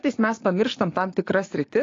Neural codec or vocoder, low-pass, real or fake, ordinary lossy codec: codec, 16 kHz, 6 kbps, DAC; 7.2 kHz; fake; AAC, 32 kbps